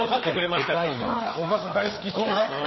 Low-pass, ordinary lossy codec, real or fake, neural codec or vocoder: 7.2 kHz; MP3, 24 kbps; fake; codec, 16 kHz, 4 kbps, FreqCodec, larger model